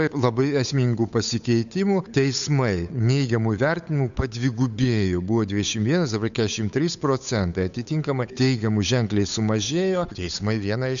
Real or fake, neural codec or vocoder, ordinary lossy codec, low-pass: fake; codec, 16 kHz, 8 kbps, FunCodec, trained on Chinese and English, 25 frames a second; AAC, 96 kbps; 7.2 kHz